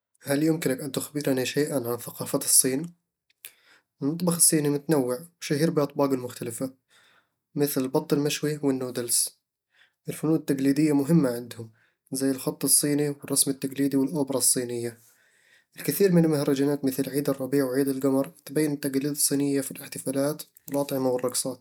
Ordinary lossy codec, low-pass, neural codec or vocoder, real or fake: none; none; none; real